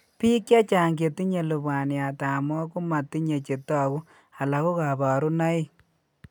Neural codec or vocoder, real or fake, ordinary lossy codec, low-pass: none; real; none; 19.8 kHz